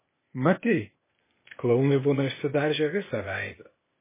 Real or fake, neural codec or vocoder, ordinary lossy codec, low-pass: fake; codec, 16 kHz, 0.8 kbps, ZipCodec; MP3, 16 kbps; 3.6 kHz